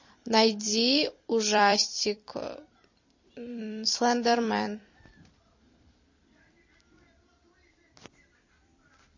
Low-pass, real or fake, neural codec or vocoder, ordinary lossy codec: 7.2 kHz; fake; vocoder, 44.1 kHz, 128 mel bands every 256 samples, BigVGAN v2; MP3, 32 kbps